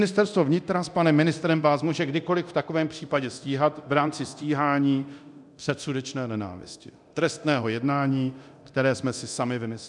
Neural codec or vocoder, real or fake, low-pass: codec, 24 kHz, 0.9 kbps, DualCodec; fake; 10.8 kHz